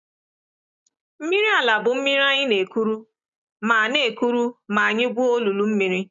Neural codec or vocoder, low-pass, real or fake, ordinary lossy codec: none; 7.2 kHz; real; none